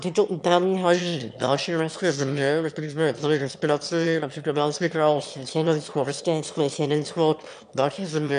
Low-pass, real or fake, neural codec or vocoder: 9.9 kHz; fake; autoencoder, 22.05 kHz, a latent of 192 numbers a frame, VITS, trained on one speaker